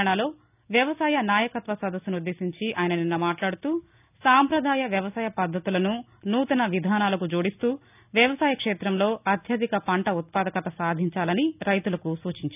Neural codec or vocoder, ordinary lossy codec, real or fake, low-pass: none; none; real; 3.6 kHz